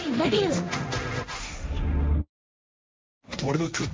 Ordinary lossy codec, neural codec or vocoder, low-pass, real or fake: none; codec, 16 kHz, 1.1 kbps, Voila-Tokenizer; none; fake